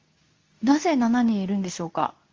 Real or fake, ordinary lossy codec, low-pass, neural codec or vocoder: fake; Opus, 32 kbps; 7.2 kHz; codec, 24 kHz, 0.9 kbps, WavTokenizer, medium speech release version 2